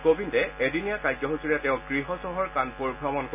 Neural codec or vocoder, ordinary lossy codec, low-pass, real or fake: none; none; 3.6 kHz; real